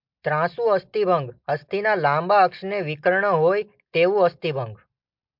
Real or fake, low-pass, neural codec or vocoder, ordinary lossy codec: real; 5.4 kHz; none; MP3, 48 kbps